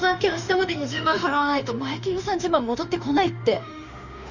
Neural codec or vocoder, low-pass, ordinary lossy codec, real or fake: autoencoder, 48 kHz, 32 numbers a frame, DAC-VAE, trained on Japanese speech; 7.2 kHz; none; fake